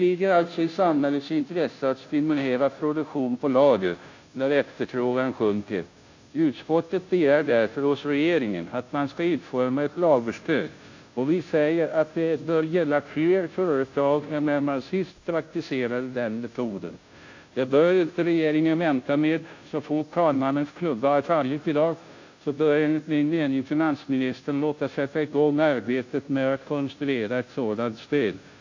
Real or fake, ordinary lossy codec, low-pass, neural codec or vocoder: fake; AAC, 48 kbps; 7.2 kHz; codec, 16 kHz, 0.5 kbps, FunCodec, trained on Chinese and English, 25 frames a second